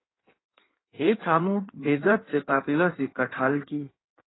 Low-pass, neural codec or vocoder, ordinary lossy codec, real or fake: 7.2 kHz; codec, 16 kHz in and 24 kHz out, 1.1 kbps, FireRedTTS-2 codec; AAC, 16 kbps; fake